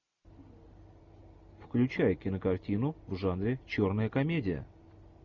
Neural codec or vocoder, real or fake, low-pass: none; real; 7.2 kHz